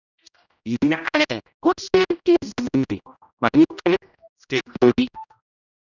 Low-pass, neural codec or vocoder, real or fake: 7.2 kHz; codec, 16 kHz, 0.5 kbps, X-Codec, HuBERT features, trained on general audio; fake